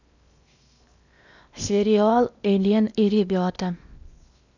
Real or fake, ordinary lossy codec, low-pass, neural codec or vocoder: fake; none; 7.2 kHz; codec, 16 kHz in and 24 kHz out, 0.8 kbps, FocalCodec, streaming, 65536 codes